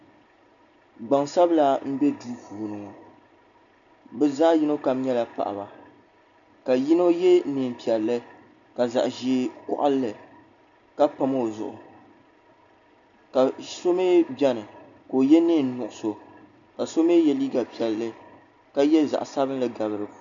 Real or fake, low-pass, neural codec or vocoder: real; 7.2 kHz; none